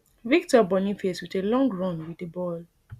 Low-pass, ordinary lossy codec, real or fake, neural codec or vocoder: 14.4 kHz; Opus, 64 kbps; real; none